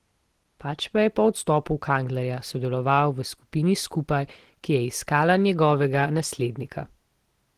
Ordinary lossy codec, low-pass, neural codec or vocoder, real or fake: Opus, 16 kbps; 14.4 kHz; none; real